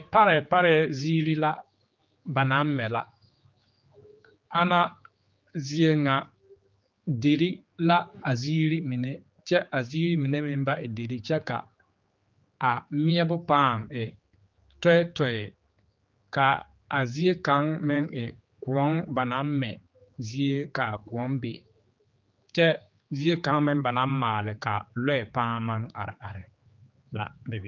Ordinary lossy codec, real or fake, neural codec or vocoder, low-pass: Opus, 24 kbps; fake; codec, 16 kHz, 4 kbps, X-Codec, HuBERT features, trained on general audio; 7.2 kHz